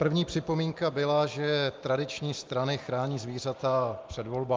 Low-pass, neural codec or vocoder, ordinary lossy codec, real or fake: 7.2 kHz; none; Opus, 32 kbps; real